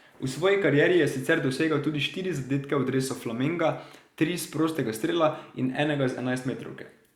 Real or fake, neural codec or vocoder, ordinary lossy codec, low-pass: real; none; Opus, 64 kbps; 19.8 kHz